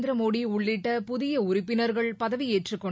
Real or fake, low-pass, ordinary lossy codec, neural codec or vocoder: real; none; none; none